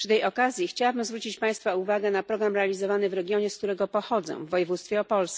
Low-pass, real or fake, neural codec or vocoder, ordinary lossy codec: none; real; none; none